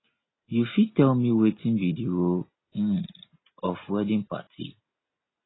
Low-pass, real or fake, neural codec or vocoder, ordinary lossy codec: 7.2 kHz; real; none; AAC, 16 kbps